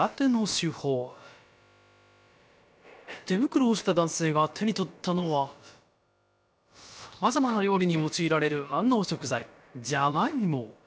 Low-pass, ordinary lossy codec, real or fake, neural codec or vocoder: none; none; fake; codec, 16 kHz, about 1 kbps, DyCAST, with the encoder's durations